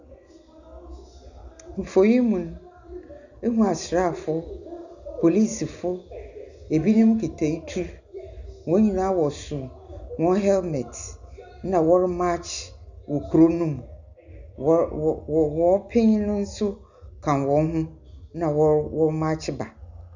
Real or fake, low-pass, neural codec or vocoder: real; 7.2 kHz; none